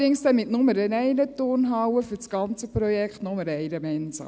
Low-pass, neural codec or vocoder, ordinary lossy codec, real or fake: none; none; none; real